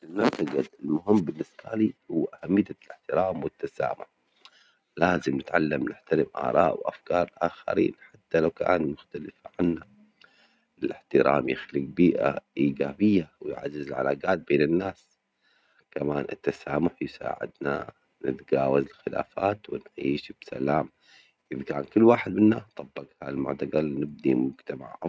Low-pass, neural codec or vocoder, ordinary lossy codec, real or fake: none; none; none; real